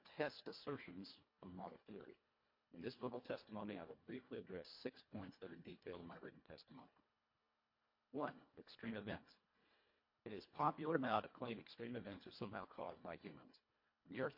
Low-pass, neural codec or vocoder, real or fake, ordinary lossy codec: 5.4 kHz; codec, 24 kHz, 1.5 kbps, HILCodec; fake; MP3, 32 kbps